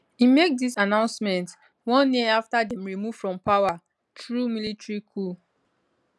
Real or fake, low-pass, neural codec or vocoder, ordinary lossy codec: real; none; none; none